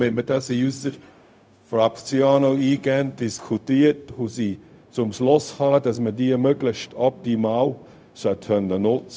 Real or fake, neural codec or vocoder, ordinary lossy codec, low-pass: fake; codec, 16 kHz, 0.4 kbps, LongCat-Audio-Codec; none; none